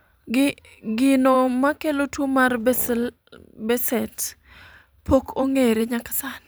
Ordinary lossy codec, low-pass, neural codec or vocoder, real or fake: none; none; vocoder, 44.1 kHz, 128 mel bands every 512 samples, BigVGAN v2; fake